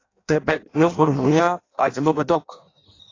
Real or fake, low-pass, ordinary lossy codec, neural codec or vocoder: fake; 7.2 kHz; AAC, 32 kbps; codec, 16 kHz in and 24 kHz out, 0.6 kbps, FireRedTTS-2 codec